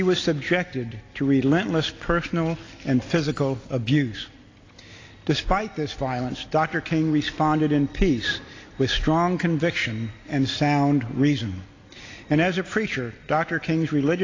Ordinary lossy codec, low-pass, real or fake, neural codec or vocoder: AAC, 32 kbps; 7.2 kHz; real; none